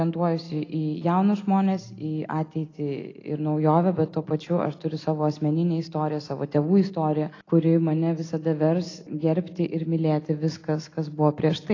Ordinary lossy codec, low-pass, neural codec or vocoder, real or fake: AAC, 32 kbps; 7.2 kHz; none; real